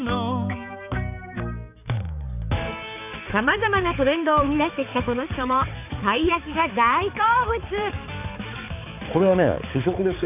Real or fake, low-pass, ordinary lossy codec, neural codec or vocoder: fake; 3.6 kHz; none; codec, 16 kHz, 4 kbps, X-Codec, HuBERT features, trained on balanced general audio